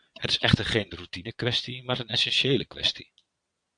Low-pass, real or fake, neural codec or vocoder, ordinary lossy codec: 9.9 kHz; fake; vocoder, 22.05 kHz, 80 mel bands, Vocos; AAC, 64 kbps